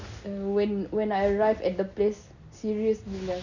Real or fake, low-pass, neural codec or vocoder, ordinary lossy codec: real; 7.2 kHz; none; none